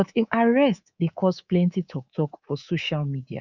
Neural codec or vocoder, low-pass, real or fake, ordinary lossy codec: codec, 24 kHz, 3.1 kbps, DualCodec; 7.2 kHz; fake; none